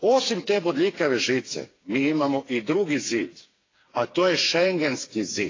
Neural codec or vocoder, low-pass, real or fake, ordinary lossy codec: codec, 16 kHz, 4 kbps, FreqCodec, smaller model; 7.2 kHz; fake; AAC, 32 kbps